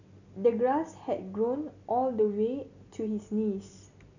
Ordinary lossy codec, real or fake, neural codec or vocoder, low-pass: none; real; none; 7.2 kHz